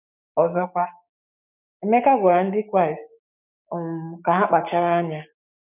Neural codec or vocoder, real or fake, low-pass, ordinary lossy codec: codec, 16 kHz, 6 kbps, DAC; fake; 3.6 kHz; none